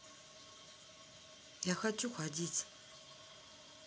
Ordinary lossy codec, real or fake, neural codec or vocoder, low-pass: none; real; none; none